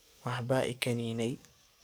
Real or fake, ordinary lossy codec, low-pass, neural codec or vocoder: fake; none; none; codec, 44.1 kHz, 7.8 kbps, DAC